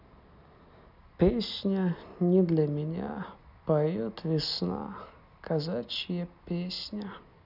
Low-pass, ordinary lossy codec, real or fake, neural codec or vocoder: 5.4 kHz; none; real; none